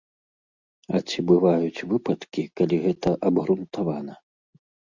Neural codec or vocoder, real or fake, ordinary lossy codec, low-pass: none; real; Opus, 64 kbps; 7.2 kHz